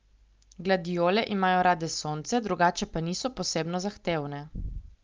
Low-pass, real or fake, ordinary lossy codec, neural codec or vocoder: 7.2 kHz; real; Opus, 32 kbps; none